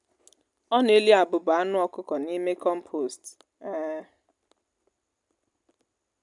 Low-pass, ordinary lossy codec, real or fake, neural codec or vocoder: 10.8 kHz; none; real; none